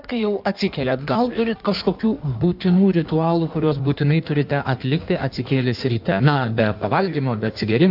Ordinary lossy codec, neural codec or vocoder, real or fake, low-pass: AAC, 48 kbps; codec, 16 kHz in and 24 kHz out, 1.1 kbps, FireRedTTS-2 codec; fake; 5.4 kHz